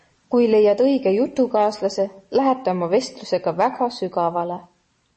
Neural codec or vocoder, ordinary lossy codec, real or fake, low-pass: none; MP3, 32 kbps; real; 10.8 kHz